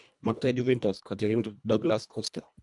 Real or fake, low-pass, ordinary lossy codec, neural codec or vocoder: fake; 10.8 kHz; none; codec, 24 kHz, 1.5 kbps, HILCodec